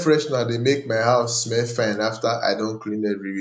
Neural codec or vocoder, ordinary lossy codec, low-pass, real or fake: none; none; 9.9 kHz; real